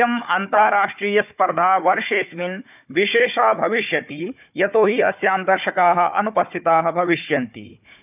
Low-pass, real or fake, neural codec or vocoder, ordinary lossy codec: 3.6 kHz; fake; codec, 16 kHz, 4 kbps, FunCodec, trained on Chinese and English, 50 frames a second; none